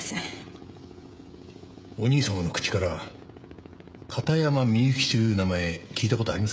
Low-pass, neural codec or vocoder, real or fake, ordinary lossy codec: none; codec, 16 kHz, 16 kbps, FreqCodec, smaller model; fake; none